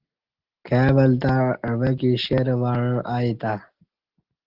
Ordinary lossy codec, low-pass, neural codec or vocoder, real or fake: Opus, 16 kbps; 5.4 kHz; none; real